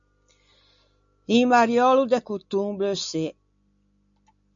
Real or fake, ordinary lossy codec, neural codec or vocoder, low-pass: real; MP3, 64 kbps; none; 7.2 kHz